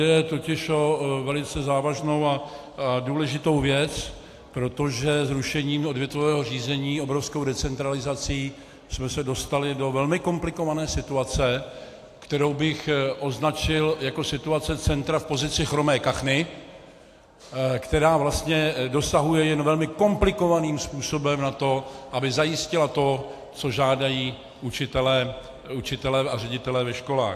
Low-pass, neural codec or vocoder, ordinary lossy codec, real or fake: 14.4 kHz; none; AAC, 64 kbps; real